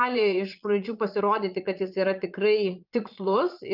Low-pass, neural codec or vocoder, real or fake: 5.4 kHz; vocoder, 22.05 kHz, 80 mel bands, Vocos; fake